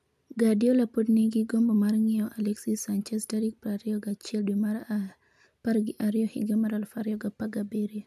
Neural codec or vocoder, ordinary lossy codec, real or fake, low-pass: none; none; real; 14.4 kHz